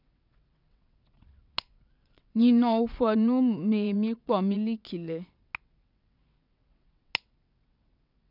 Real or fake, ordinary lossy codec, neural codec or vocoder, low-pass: fake; none; vocoder, 22.05 kHz, 80 mel bands, WaveNeXt; 5.4 kHz